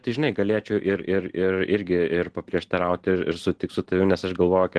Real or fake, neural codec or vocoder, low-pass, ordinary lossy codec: real; none; 10.8 kHz; Opus, 16 kbps